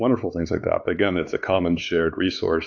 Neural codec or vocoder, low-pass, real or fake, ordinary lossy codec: codec, 16 kHz, 4 kbps, X-Codec, WavLM features, trained on Multilingual LibriSpeech; 7.2 kHz; fake; AAC, 48 kbps